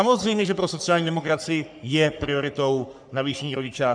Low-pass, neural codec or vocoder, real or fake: 9.9 kHz; codec, 44.1 kHz, 3.4 kbps, Pupu-Codec; fake